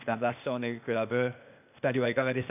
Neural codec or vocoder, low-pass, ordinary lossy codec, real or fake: codec, 16 kHz, 0.8 kbps, ZipCodec; 3.6 kHz; none; fake